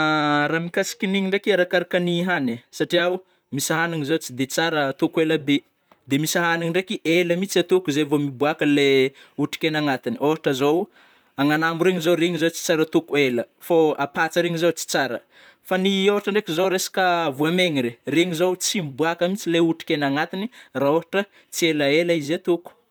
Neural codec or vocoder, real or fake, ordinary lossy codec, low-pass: vocoder, 44.1 kHz, 128 mel bands, Pupu-Vocoder; fake; none; none